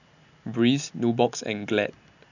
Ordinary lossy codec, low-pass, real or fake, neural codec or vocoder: none; 7.2 kHz; real; none